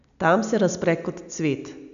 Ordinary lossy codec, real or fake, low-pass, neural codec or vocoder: none; real; 7.2 kHz; none